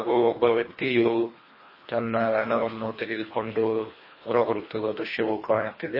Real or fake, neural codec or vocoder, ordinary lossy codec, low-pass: fake; codec, 24 kHz, 1.5 kbps, HILCodec; MP3, 24 kbps; 5.4 kHz